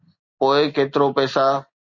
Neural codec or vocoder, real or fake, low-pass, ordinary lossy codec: none; real; 7.2 kHz; Opus, 64 kbps